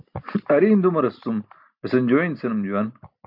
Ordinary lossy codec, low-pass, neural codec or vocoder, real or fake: MP3, 48 kbps; 5.4 kHz; none; real